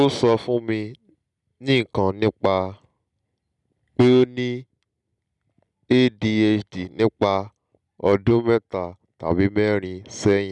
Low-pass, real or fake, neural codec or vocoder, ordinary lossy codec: 10.8 kHz; real; none; none